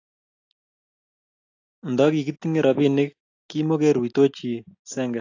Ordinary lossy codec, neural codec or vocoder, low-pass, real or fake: AAC, 48 kbps; none; 7.2 kHz; real